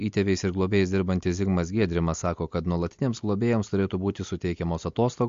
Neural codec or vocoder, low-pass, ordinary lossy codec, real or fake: none; 7.2 kHz; MP3, 48 kbps; real